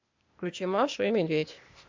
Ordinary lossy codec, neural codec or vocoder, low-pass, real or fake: MP3, 64 kbps; codec, 16 kHz, 0.8 kbps, ZipCodec; 7.2 kHz; fake